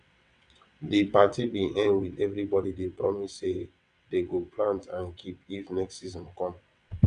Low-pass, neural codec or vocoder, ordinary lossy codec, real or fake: 9.9 kHz; vocoder, 22.05 kHz, 80 mel bands, WaveNeXt; none; fake